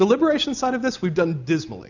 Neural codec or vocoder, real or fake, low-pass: none; real; 7.2 kHz